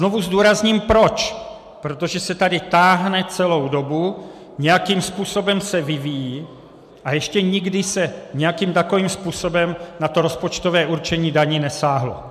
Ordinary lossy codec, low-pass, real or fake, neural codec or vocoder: MP3, 96 kbps; 14.4 kHz; real; none